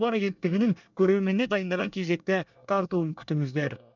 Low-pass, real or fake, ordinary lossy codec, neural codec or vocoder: 7.2 kHz; fake; none; codec, 24 kHz, 1 kbps, SNAC